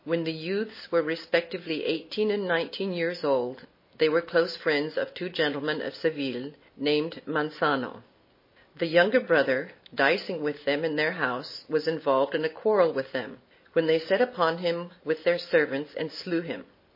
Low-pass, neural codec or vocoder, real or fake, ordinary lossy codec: 5.4 kHz; none; real; MP3, 24 kbps